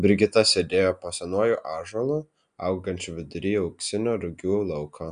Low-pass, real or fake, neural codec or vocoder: 9.9 kHz; real; none